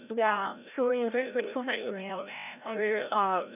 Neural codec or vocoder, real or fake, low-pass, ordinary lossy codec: codec, 16 kHz, 0.5 kbps, FreqCodec, larger model; fake; 3.6 kHz; none